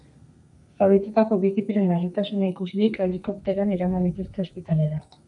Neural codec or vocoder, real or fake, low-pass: codec, 32 kHz, 1.9 kbps, SNAC; fake; 10.8 kHz